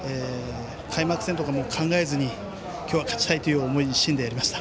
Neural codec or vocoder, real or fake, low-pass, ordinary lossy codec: none; real; none; none